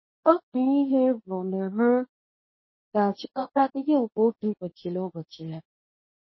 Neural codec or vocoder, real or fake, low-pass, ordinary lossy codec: codec, 24 kHz, 0.9 kbps, WavTokenizer, medium speech release version 2; fake; 7.2 kHz; MP3, 24 kbps